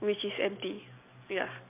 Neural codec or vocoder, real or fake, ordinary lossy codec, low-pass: none; real; MP3, 32 kbps; 3.6 kHz